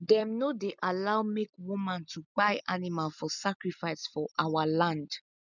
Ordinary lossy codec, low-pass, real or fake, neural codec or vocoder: none; 7.2 kHz; real; none